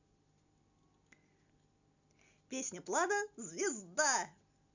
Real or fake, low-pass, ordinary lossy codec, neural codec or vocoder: real; 7.2 kHz; none; none